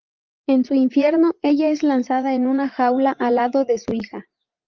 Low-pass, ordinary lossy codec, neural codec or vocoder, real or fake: 7.2 kHz; Opus, 32 kbps; vocoder, 44.1 kHz, 80 mel bands, Vocos; fake